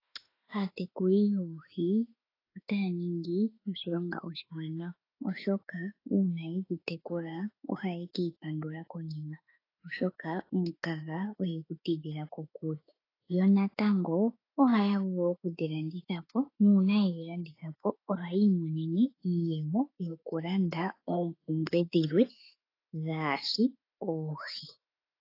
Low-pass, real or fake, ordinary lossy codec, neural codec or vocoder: 5.4 kHz; fake; AAC, 24 kbps; autoencoder, 48 kHz, 32 numbers a frame, DAC-VAE, trained on Japanese speech